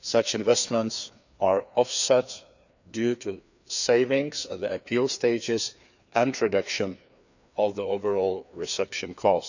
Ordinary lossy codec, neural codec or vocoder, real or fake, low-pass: none; codec, 16 kHz, 2 kbps, FreqCodec, larger model; fake; 7.2 kHz